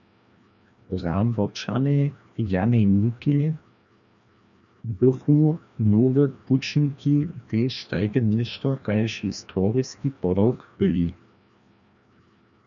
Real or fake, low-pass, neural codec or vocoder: fake; 7.2 kHz; codec, 16 kHz, 1 kbps, FreqCodec, larger model